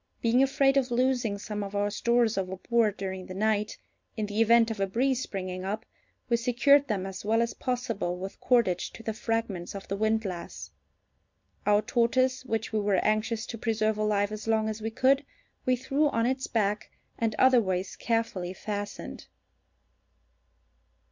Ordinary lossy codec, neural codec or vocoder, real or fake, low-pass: MP3, 64 kbps; none; real; 7.2 kHz